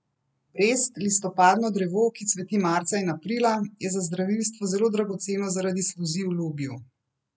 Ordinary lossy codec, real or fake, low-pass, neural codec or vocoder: none; real; none; none